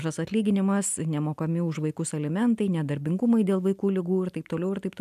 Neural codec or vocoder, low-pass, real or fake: none; 14.4 kHz; real